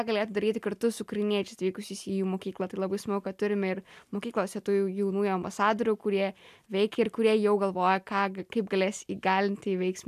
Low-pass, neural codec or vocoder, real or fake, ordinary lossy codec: 14.4 kHz; none; real; AAC, 96 kbps